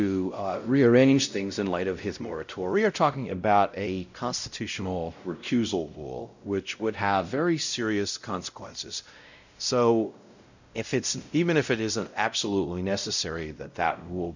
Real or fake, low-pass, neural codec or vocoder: fake; 7.2 kHz; codec, 16 kHz, 0.5 kbps, X-Codec, WavLM features, trained on Multilingual LibriSpeech